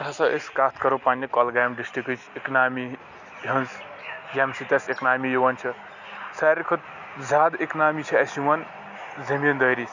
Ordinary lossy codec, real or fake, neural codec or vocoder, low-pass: none; real; none; 7.2 kHz